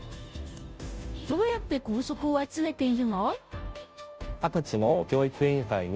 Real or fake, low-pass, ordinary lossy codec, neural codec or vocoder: fake; none; none; codec, 16 kHz, 0.5 kbps, FunCodec, trained on Chinese and English, 25 frames a second